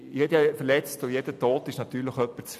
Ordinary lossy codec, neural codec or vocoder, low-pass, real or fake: AAC, 96 kbps; none; 14.4 kHz; real